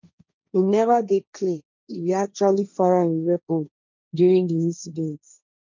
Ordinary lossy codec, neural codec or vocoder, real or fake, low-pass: none; codec, 16 kHz, 1.1 kbps, Voila-Tokenizer; fake; 7.2 kHz